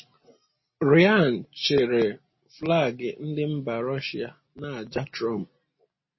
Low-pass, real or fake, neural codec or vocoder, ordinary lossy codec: 7.2 kHz; real; none; MP3, 24 kbps